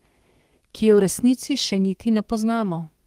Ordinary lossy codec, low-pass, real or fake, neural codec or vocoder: Opus, 24 kbps; 14.4 kHz; fake; codec, 32 kHz, 1.9 kbps, SNAC